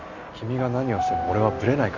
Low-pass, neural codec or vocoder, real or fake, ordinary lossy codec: 7.2 kHz; none; real; none